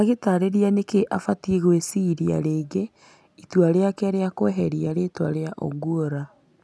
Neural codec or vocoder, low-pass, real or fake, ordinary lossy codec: none; none; real; none